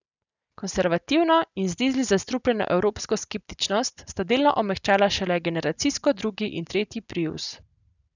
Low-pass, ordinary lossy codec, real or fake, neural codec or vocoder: 7.2 kHz; none; real; none